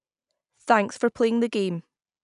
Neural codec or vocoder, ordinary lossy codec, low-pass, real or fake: none; none; 10.8 kHz; real